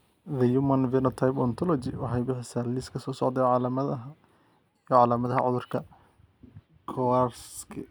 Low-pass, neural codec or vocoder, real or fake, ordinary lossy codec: none; none; real; none